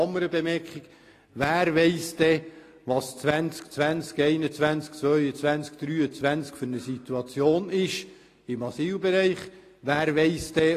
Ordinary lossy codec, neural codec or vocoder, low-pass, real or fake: AAC, 48 kbps; none; 14.4 kHz; real